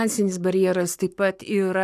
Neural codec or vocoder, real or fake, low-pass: codec, 44.1 kHz, 7.8 kbps, Pupu-Codec; fake; 14.4 kHz